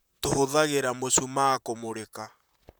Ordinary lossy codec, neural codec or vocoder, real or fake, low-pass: none; vocoder, 44.1 kHz, 128 mel bands, Pupu-Vocoder; fake; none